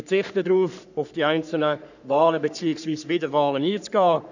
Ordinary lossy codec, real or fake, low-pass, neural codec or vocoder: none; fake; 7.2 kHz; codec, 44.1 kHz, 3.4 kbps, Pupu-Codec